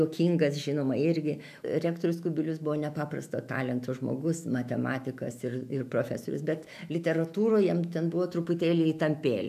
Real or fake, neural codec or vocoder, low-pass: fake; autoencoder, 48 kHz, 128 numbers a frame, DAC-VAE, trained on Japanese speech; 14.4 kHz